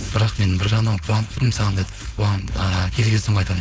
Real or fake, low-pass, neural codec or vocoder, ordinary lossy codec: fake; none; codec, 16 kHz, 4.8 kbps, FACodec; none